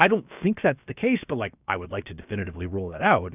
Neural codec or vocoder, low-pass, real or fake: codec, 16 kHz, about 1 kbps, DyCAST, with the encoder's durations; 3.6 kHz; fake